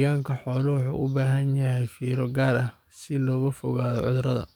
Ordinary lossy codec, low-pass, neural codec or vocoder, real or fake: none; 19.8 kHz; codec, 44.1 kHz, 7.8 kbps, DAC; fake